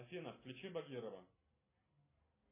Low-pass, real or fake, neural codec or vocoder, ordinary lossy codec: 3.6 kHz; real; none; MP3, 16 kbps